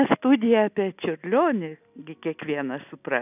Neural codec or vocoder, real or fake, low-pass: none; real; 3.6 kHz